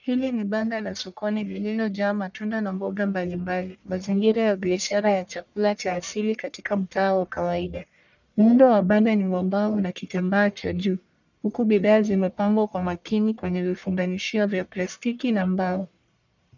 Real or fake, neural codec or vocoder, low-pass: fake; codec, 44.1 kHz, 1.7 kbps, Pupu-Codec; 7.2 kHz